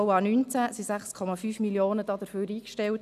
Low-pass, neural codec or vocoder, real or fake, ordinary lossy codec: 14.4 kHz; none; real; none